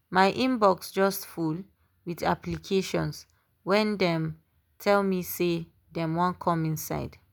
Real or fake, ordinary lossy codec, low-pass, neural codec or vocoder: real; none; none; none